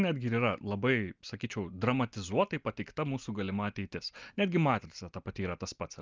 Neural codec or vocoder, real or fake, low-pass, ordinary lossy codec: none; real; 7.2 kHz; Opus, 24 kbps